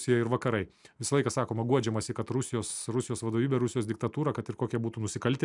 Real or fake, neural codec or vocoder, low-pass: real; none; 10.8 kHz